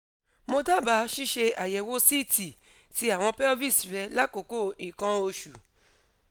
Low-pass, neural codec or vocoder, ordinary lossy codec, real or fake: none; none; none; real